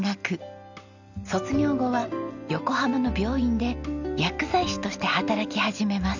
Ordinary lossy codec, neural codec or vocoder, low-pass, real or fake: none; none; 7.2 kHz; real